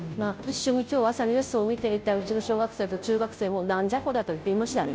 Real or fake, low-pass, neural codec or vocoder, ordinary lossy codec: fake; none; codec, 16 kHz, 0.5 kbps, FunCodec, trained on Chinese and English, 25 frames a second; none